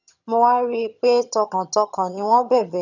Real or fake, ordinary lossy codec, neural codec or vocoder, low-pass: fake; none; vocoder, 22.05 kHz, 80 mel bands, HiFi-GAN; 7.2 kHz